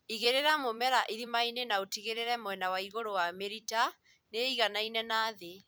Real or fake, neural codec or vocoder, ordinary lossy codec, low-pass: fake; vocoder, 44.1 kHz, 128 mel bands every 256 samples, BigVGAN v2; none; none